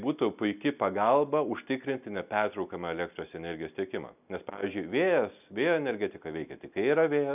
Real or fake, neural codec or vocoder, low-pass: real; none; 3.6 kHz